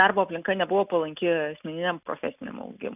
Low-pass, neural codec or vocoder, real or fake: 3.6 kHz; none; real